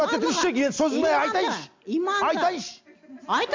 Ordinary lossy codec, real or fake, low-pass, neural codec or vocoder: MP3, 48 kbps; real; 7.2 kHz; none